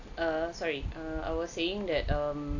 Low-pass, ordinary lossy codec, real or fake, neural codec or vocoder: 7.2 kHz; AAC, 48 kbps; real; none